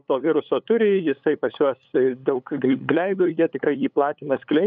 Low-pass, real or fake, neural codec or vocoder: 7.2 kHz; fake; codec, 16 kHz, 8 kbps, FunCodec, trained on LibriTTS, 25 frames a second